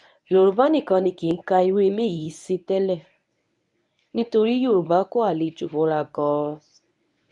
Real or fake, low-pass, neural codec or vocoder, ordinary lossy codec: fake; 10.8 kHz; codec, 24 kHz, 0.9 kbps, WavTokenizer, medium speech release version 1; none